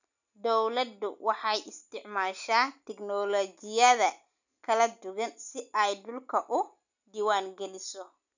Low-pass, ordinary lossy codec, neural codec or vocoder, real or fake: 7.2 kHz; none; none; real